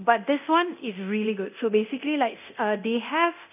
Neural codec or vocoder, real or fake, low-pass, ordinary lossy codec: codec, 24 kHz, 0.9 kbps, DualCodec; fake; 3.6 kHz; none